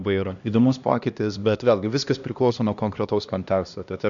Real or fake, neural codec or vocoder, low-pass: fake; codec, 16 kHz, 1 kbps, X-Codec, HuBERT features, trained on LibriSpeech; 7.2 kHz